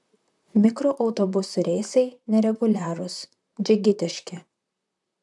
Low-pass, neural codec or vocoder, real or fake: 10.8 kHz; vocoder, 44.1 kHz, 128 mel bands, Pupu-Vocoder; fake